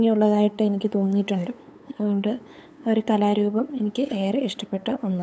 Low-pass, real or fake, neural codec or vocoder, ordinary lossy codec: none; fake; codec, 16 kHz, 8 kbps, FunCodec, trained on LibriTTS, 25 frames a second; none